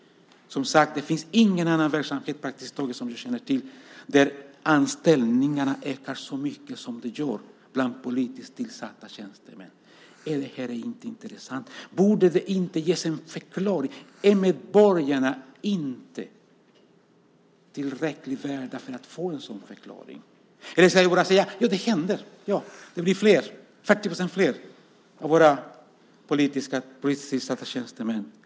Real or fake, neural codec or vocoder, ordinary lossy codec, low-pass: real; none; none; none